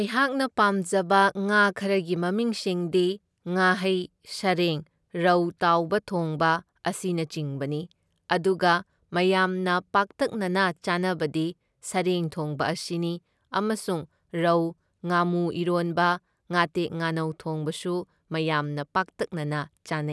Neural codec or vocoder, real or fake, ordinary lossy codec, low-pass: none; real; none; none